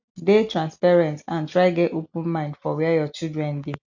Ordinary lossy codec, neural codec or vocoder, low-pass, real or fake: none; none; 7.2 kHz; real